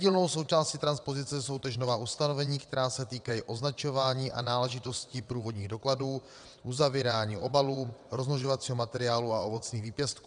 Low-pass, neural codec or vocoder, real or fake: 9.9 kHz; vocoder, 22.05 kHz, 80 mel bands, WaveNeXt; fake